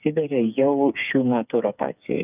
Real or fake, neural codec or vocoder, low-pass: fake; codec, 16 kHz, 4 kbps, FreqCodec, smaller model; 3.6 kHz